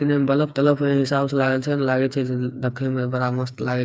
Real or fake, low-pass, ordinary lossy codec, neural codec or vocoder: fake; none; none; codec, 16 kHz, 4 kbps, FreqCodec, smaller model